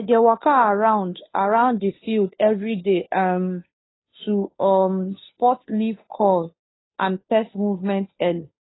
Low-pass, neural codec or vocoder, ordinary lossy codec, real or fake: 7.2 kHz; codec, 16 kHz, 2 kbps, FunCodec, trained on Chinese and English, 25 frames a second; AAC, 16 kbps; fake